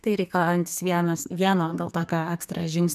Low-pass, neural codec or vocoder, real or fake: 14.4 kHz; codec, 32 kHz, 1.9 kbps, SNAC; fake